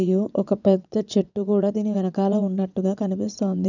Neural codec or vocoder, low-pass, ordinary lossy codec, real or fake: vocoder, 22.05 kHz, 80 mel bands, WaveNeXt; 7.2 kHz; none; fake